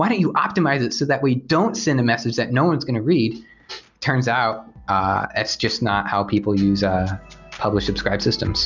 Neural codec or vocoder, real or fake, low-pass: none; real; 7.2 kHz